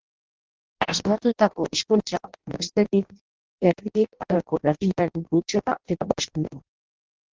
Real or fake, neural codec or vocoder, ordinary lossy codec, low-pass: fake; codec, 16 kHz in and 24 kHz out, 0.6 kbps, FireRedTTS-2 codec; Opus, 16 kbps; 7.2 kHz